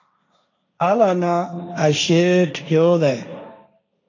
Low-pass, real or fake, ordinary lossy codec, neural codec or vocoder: 7.2 kHz; fake; AAC, 48 kbps; codec, 16 kHz, 1.1 kbps, Voila-Tokenizer